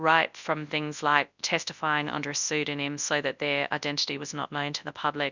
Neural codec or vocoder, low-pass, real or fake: codec, 24 kHz, 0.9 kbps, WavTokenizer, large speech release; 7.2 kHz; fake